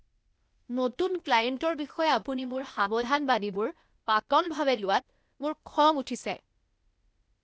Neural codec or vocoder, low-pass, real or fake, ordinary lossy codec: codec, 16 kHz, 0.8 kbps, ZipCodec; none; fake; none